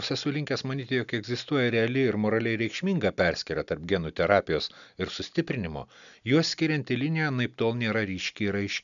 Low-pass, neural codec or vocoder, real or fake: 7.2 kHz; none; real